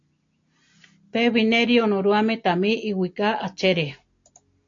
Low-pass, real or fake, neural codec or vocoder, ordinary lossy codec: 7.2 kHz; real; none; AAC, 48 kbps